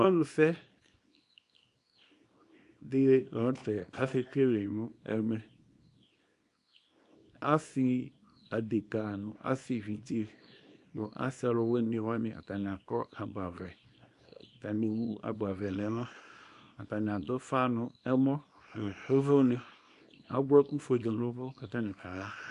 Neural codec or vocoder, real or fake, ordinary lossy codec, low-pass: codec, 24 kHz, 0.9 kbps, WavTokenizer, small release; fake; MP3, 64 kbps; 10.8 kHz